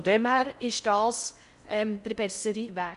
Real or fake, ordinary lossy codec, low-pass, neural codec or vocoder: fake; none; 10.8 kHz; codec, 16 kHz in and 24 kHz out, 0.6 kbps, FocalCodec, streaming, 4096 codes